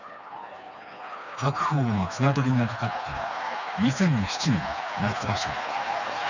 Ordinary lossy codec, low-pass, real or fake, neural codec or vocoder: none; 7.2 kHz; fake; codec, 16 kHz, 2 kbps, FreqCodec, smaller model